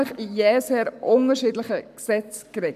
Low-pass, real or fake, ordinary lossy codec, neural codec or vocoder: 14.4 kHz; fake; none; codec, 44.1 kHz, 7.8 kbps, Pupu-Codec